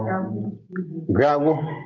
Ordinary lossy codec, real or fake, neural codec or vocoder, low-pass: Opus, 32 kbps; real; none; 7.2 kHz